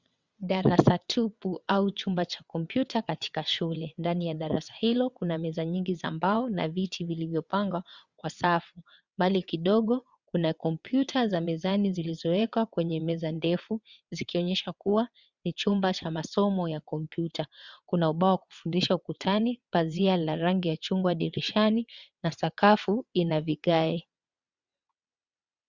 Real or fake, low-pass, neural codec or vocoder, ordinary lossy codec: fake; 7.2 kHz; vocoder, 22.05 kHz, 80 mel bands, WaveNeXt; Opus, 64 kbps